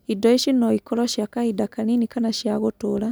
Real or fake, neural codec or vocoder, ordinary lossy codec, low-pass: real; none; none; none